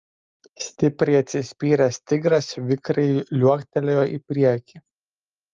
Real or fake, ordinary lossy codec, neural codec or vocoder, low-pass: real; Opus, 32 kbps; none; 7.2 kHz